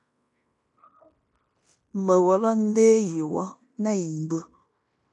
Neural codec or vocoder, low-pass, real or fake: codec, 16 kHz in and 24 kHz out, 0.9 kbps, LongCat-Audio-Codec, fine tuned four codebook decoder; 10.8 kHz; fake